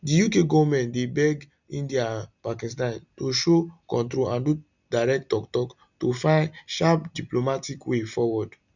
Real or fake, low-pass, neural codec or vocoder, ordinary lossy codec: real; 7.2 kHz; none; none